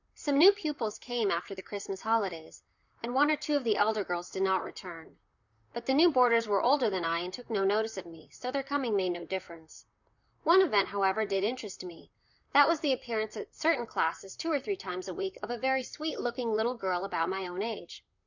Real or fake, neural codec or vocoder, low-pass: fake; vocoder, 44.1 kHz, 128 mel bands, Pupu-Vocoder; 7.2 kHz